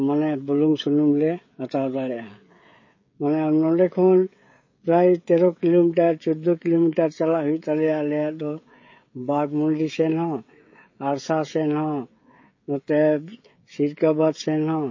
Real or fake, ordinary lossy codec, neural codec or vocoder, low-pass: fake; MP3, 32 kbps; codec, 16 kHz, 16 kbps, FreqCodec, smaller model; 7.2 kHz